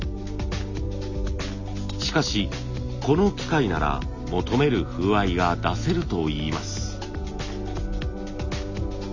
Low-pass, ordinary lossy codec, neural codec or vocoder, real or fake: 7.2 kHz; Opus, 64 kbps; none; real